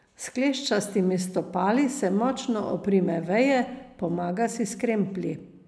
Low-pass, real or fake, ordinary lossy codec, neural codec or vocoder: none; real; none; none